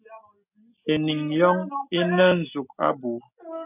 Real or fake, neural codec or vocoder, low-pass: real; none; 3.6 kHz